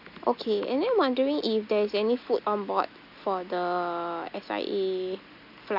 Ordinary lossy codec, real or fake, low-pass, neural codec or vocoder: none; real; 5.4 kHz; none